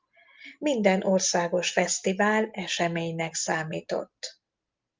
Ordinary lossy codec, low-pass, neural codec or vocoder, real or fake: Opus, 32 kbps; 7.2 kHz; none; real